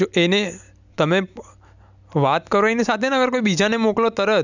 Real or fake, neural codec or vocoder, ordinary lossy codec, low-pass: real; none; none; 7.2 kHz